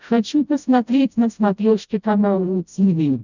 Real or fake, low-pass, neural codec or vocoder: fake; 7.2 kHz; codec, 16 kHz, 0.5 kbps, FreqCodec, smaller model